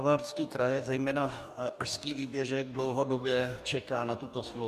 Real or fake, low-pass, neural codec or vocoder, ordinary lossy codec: fake; 14.4 kHz; codec, 44.1 kHz, 2.6 kbps, DAC; MP3, 96 kbps